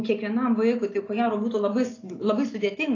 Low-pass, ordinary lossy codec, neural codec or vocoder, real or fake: 7.2 kHz; AAC, 32 kbps; none; real